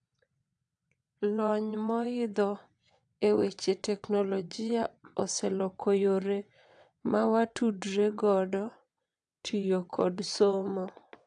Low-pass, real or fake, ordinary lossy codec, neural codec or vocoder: 9.9 kHz; fake; AAC, 64 kbps; vocoder, 22.05 kHz, 80 mel bands, WaveNeXt